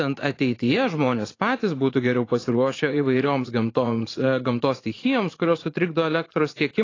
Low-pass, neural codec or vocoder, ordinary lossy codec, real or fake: 7.2 kHz; vocoder, 44.1 kHz, 80 mel bands, Vocos; AAC, 32 kbps; fake